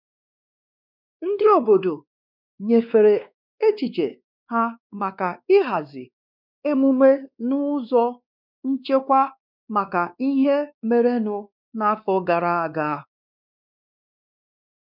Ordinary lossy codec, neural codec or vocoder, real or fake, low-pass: none; codec, 16 kHz, 2 kbps, X-Codec, WavLM features, trained on Multilingual LibriSpeech; fake; 5.4 kHz